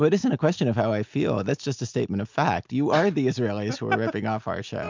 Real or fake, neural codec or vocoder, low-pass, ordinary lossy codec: real; none; 7.2 kHz; MP3, 64 kbps